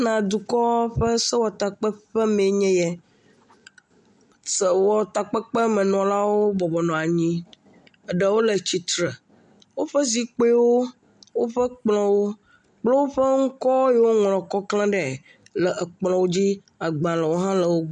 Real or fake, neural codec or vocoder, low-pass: real; none; 10.8 kHz